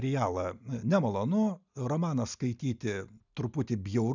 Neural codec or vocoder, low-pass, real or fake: none; 7.2 kHz; real